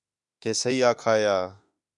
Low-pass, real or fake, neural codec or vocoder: 10.8 kHz; fake; autoencoder, 48 kHz, 32 numbers a frame, DAC-VAE, trained on Japanese speech